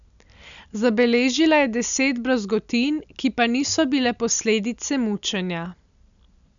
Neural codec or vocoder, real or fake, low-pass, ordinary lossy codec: none; real; 7.2 kHz; none